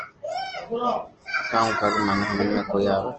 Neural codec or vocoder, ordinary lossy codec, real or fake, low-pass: none; Opus, 16 kbps; real; 7.2 kHz